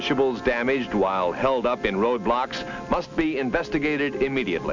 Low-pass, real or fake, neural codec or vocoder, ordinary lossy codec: 7.2 kHz; real; none; MP3, 64 kbps